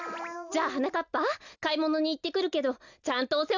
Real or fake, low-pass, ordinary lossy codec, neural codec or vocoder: real; 7.2 kHz; none; none